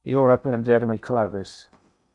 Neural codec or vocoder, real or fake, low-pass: codec, 16 kHz in and 24 kHz out, 0.8 kbps, FocalCodec, streaming, 65536 codes; fake; 10.8 kHz